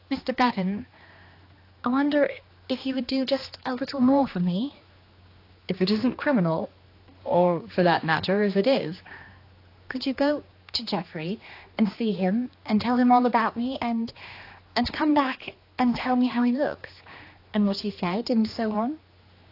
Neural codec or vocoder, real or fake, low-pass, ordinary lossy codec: codec, 16 kHz, 2 kbps, X-Codec, HuBERT features, trained on general audio; fake; 5.4 kHz; AAC, 32 kbps